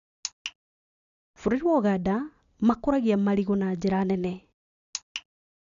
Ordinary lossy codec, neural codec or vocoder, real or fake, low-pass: none; none; real; 7.2 kHz